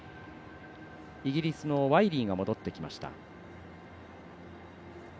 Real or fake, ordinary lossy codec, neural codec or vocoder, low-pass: real; none; none; none